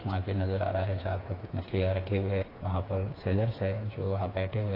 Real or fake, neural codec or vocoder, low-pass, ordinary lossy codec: fake; codec, 16 kHz, 4 kbps, FreqCodec, smaller model; 5.4 kHz; AAC, 24 kbps